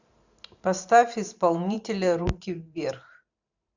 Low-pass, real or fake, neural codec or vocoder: 7.2 kHz; fake; vocoder, 44.1 kHz, 128 mel bands every 512 samples, BigVGAN v2